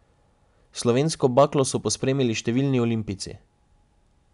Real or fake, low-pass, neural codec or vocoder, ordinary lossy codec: real; 10.8 kHz; none; none